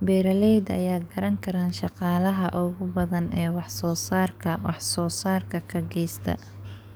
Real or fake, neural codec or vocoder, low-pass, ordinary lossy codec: fake; codec, 44.1 kHz, 7.8 kbps, DAC; none; none